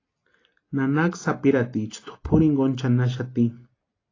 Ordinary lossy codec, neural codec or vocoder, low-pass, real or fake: AAC, 32 kbps; none; 7.2 kHz; real